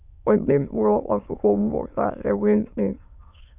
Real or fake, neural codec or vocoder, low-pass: fake; autoencoder, 22.05 kHz, a latent of 192 numbers a frame, VITS, trained on many speakers; 3.6 kHz